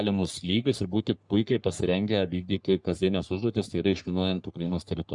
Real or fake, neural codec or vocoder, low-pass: fake; codec, 44.1 kHz, 3.4 kbps, Pupu-Codec; 10.8 kHz